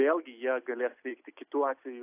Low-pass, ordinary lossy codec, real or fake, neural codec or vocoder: 3.6 kHz; AAC, 24 kbps; real; none